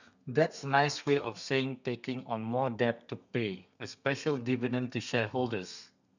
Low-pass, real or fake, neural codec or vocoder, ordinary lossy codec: 7.2 kHz; fake; codec, 32 kHz, 1.9 kbps, SNAC; none